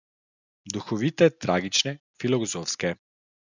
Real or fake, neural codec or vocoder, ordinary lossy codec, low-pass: real; none; none; 7.2 kHz